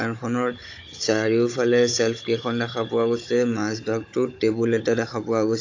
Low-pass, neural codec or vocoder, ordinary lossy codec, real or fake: 7.2 kHz; codec, 16 kHz, 16 kbps, FunCodec, trained on Chinese and English, 50 frames a second; AAC, 48 kbps; fake